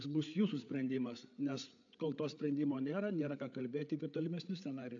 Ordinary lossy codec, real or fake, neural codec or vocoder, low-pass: AAC, 64 kbps; fake; codec, 16 kHz, 8 kbps, FreqCodec, larger model; 7.2 kHz